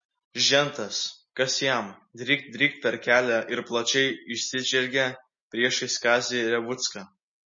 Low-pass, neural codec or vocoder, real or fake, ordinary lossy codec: 7.2 kHz; none; real; MP3, 32 kbps